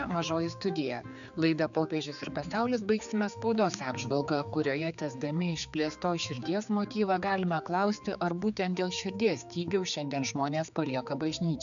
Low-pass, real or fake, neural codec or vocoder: 7.2 kHz; fake; codec, 16 kHz, 4 kbps, X-Codec, HuBERT features, trained on general audio